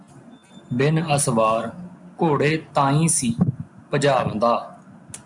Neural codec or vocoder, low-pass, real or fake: none; 10.8 kHz; real